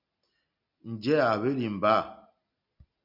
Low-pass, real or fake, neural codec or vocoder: 5.4 kHz; real; none